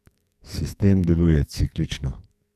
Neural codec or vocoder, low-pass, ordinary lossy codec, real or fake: codec, 44.1 kHz, 2.6 kbps, SNAC; 14.4 kHz; none; fake